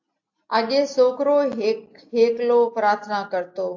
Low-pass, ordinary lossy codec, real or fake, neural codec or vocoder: 7.2 kHz; AAC, 48 kbps; real; none